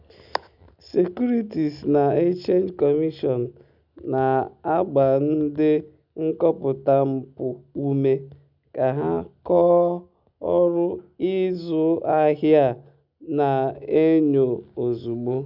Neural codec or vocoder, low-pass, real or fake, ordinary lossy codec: none; 5.4 kHz; real; none